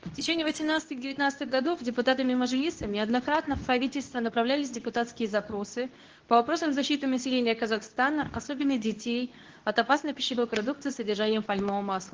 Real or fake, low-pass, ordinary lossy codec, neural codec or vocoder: fake; 7.2 kHz; Opus, 16 kbps; codec, 24 kHz, 0.9 kbps, WavTokenizer, medium speech release version 2